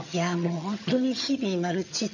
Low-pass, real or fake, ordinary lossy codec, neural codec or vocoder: 7.2 kHz; fake; none; vocoder, 22.05 kHz, 80 mel bands, HiFi-GAN